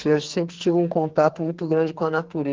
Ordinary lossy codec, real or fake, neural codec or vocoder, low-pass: Opus, 16 kbps; fake; codec, 44.1 kHz, 2.6 kbps, SNAC; 7.2 kHz